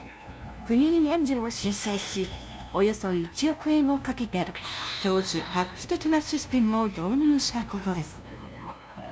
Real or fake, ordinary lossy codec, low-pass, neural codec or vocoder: fake; none; none; codec, 16 kHz, 0.5 kbps, FunCodec, trained on LibriTTS, 25 frames a second